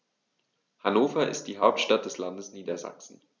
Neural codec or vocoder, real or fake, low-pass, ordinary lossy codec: none; real; none; none